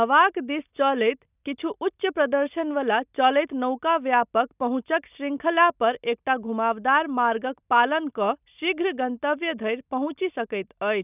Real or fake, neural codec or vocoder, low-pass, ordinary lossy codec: real; none; 3.6 kHz; none